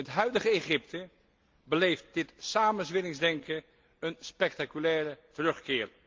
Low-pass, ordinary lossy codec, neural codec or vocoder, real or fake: 7.2 kHz; Opus, 32 kbps; none; real